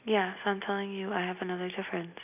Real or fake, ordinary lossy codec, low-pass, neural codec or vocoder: real; none; 3.6 kHz; none